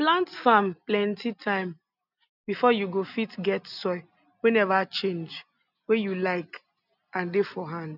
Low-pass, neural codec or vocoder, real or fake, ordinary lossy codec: 5.4 kHz; none; real; none